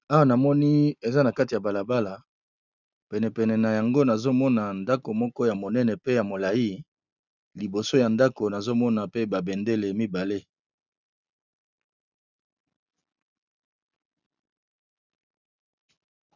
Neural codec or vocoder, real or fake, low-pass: none; real; 7.2 kHz